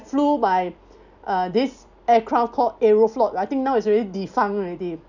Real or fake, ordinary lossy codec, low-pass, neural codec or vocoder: real; none; 7.2 kHz; none